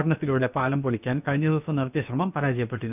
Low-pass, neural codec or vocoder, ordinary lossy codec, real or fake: 3.6 kHz; codec, 16 kHz, about 1 kbps, DyCAST, with the encoder's durations; none; fake